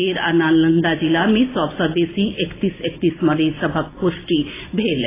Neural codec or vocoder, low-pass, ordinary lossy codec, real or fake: none; 3.6 kHz; AAC, 16 kbps; real